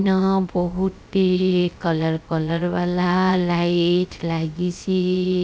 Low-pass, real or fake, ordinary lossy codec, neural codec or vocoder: none; fake; none; codec, 16 kHz, 0.3 kbps, FocalCodec